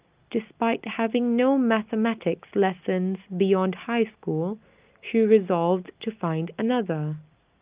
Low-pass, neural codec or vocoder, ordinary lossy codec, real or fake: 3.6 kHz; none; Opus, 24 kbps; real